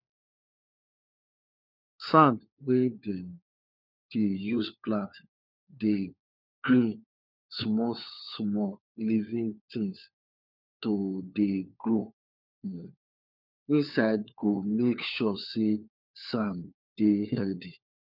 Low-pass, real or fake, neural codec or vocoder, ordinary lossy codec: 5.4 kHz; fake; codec, 16 kHz, 4 kbps, FunCodec, trained on LibriTTS, 50 frames a second; none